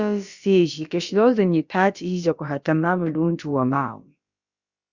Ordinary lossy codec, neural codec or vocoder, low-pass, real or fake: Opus, 64 kbps; codec, 16 kHz, about 1 kbps, DyCAST, with the encoder's durations; 7.2 kHz; fake